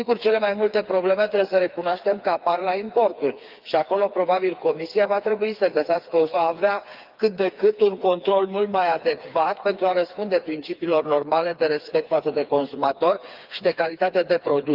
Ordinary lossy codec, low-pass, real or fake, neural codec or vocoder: Opus, 24 kbps; 5.4 kHz; fake; codec, 16 kHz, 4 kbps, FreqCodec, smaller model